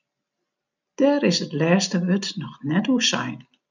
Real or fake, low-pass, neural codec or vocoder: real; 7.2 kHz; none